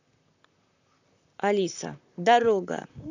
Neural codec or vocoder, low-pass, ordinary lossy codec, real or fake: vocoder, 44.1 kHz, 128 mel bands, Pupu-Vocoder; 7.2 kHz; none; fake